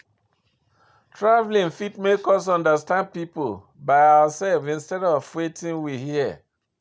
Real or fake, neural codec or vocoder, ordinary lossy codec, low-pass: real; none; none; none